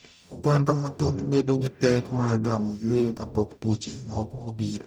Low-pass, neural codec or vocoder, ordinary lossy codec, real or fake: none; codec, 44.1 kHz, 0.9 kbps, DAC; none; fake